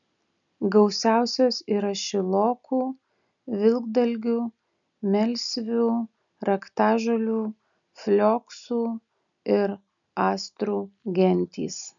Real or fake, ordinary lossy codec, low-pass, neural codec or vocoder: real; MP3, 96 kbps; 7.2 kHz; none